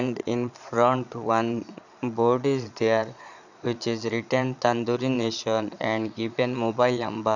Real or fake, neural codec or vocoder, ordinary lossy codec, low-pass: fake; vocoder, 22.05 kHz, 80 mel bands, Vocos; none; 7.2 kHz